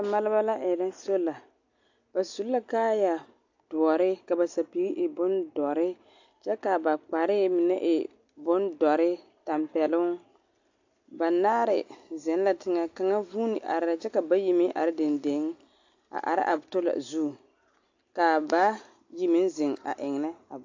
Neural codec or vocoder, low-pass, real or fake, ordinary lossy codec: none; 7.2 kHz; real; AAC, 48 kbps